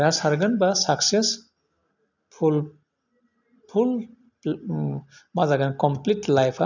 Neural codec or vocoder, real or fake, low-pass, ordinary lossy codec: none; real; 7.2 kHz; none